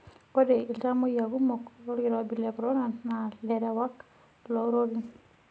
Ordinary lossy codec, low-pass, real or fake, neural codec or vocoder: none; none; real; none